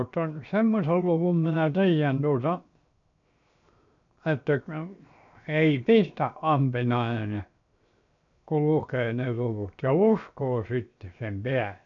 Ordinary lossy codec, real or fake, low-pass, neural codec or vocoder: none; fake; 7.2 kHz; codec, 16 kHz, 0.7 kbps, FocalCodec